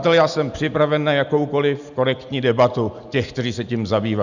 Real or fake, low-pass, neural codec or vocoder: real; 7.2 kHz; none